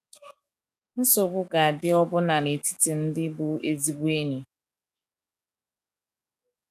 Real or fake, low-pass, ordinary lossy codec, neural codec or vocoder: fake; 14.4 kHz; none; codec, 44.1 kHz, 7.8 kbps, DAC